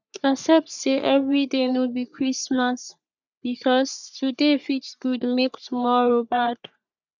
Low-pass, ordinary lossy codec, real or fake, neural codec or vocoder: 7.2 kHz; none; fake; codec, 44.1 kHz, 3.4 kbps, Pupu-Codec